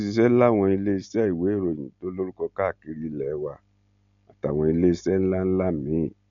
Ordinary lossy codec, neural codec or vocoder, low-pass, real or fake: none; none; 7.2 kHz; real